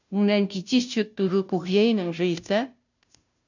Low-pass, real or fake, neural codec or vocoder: 7.2 kHz; fake; codec, 16 kHz, 0.5 kbps, FunCodec, trained on Chinese and English, 25 frames a second